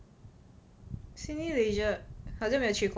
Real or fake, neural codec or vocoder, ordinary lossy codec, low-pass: real; none; none; none